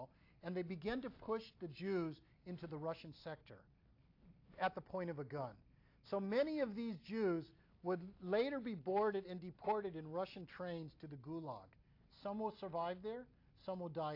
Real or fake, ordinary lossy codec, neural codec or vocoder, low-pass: real; AAC, 32 kbps; none; 5.4 kHz